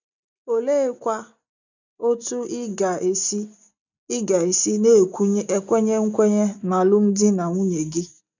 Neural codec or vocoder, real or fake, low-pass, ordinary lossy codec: none; real; 7.2 kHz; none